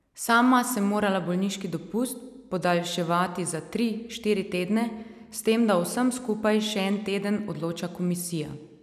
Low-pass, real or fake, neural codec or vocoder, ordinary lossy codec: 14.4 kHz; real; none; none